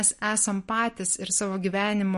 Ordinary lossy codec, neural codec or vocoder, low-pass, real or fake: MP3, 48 kbps; none; 14.4 kHz; real